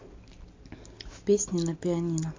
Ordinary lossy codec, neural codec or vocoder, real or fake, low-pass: MP3, 48 kbps; none; real; 7.2 kHz